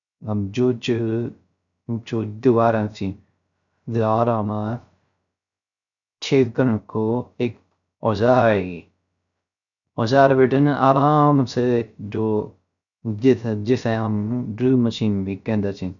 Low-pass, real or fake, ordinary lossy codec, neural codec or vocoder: 7.2 kHz; fake; none; codec, 16 kHz, 0.3 kbps, FocalCodec